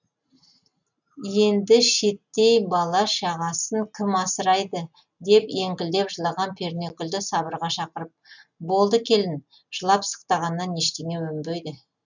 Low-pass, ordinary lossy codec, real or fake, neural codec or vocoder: 7.2 kHz; none; real; none